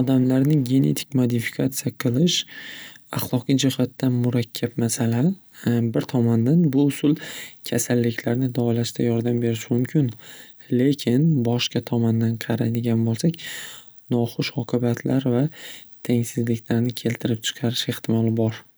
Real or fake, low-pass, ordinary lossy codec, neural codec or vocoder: real; none; none; none